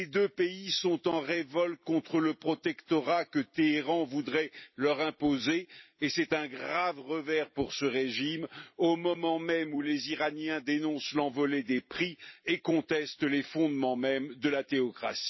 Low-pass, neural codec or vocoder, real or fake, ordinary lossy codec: 7.2 kHz; vocoder, 44.1 kHz, 128 mel bands every 256 samples, BigVGAN v2; fake; MP3, 24 kbps